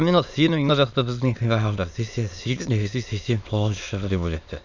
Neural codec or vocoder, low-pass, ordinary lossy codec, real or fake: autoencoder, 22.05 kHz, a latent of 192 numbers a frame, VITS, trained on many speakers; 7.2 kHz; none; fake